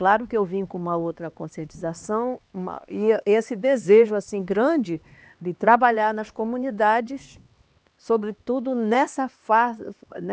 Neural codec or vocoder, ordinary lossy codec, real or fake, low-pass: codec, 16 kHz, 2 kbps, X-Codec, HuBERT features, trained on LibriSpeech; none; fake; none